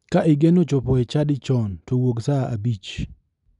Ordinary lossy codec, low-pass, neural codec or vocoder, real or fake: none; 10.8 kHz; none; real